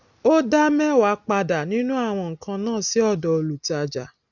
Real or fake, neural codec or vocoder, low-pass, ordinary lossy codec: real; none; 7.2 kHz; none